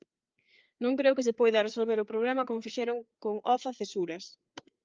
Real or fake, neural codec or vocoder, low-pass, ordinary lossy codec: fake; codec, 16 kHz, 4 kbps, FreqCodec, larger model; 7.2 kHz; Opus, 24 kbps